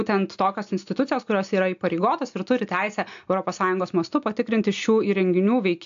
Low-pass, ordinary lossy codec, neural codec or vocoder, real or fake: 7.2 kHz; AAC, 64 kbps; none; real